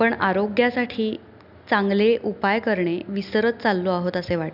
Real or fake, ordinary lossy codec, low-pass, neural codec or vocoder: real; none; 5.4 kHz; none